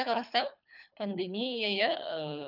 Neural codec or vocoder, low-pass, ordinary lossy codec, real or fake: codec, 24 kHz, 3 kbps, HILCodec; 5.4 kHz; none; fake